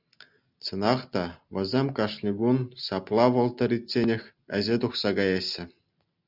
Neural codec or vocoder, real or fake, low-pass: none; real; 5.4 kHz